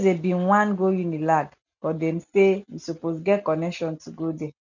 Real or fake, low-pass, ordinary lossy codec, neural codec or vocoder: real; 7.2 kHz; none; none